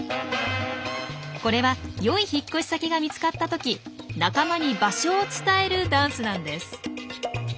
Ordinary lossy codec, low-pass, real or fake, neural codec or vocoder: none; none; real; none